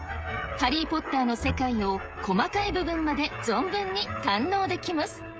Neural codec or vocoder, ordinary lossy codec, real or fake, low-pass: codec, 16 kHz, 16 kbps, FreqCodec, smaller model; none; fake; none